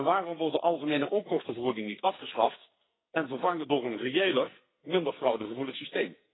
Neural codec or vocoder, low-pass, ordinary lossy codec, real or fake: codec, 44.1 kHz, 2.6 kbps, SNAC; 7.2 kHz; AAC, 16 kbps; fake